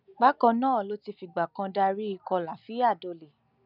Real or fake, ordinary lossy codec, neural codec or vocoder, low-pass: real; none; none; 5.4 kHz